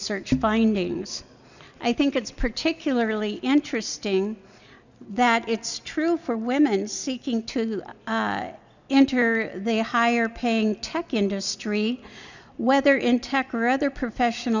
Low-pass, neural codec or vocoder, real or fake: 7.2 kHz; none; real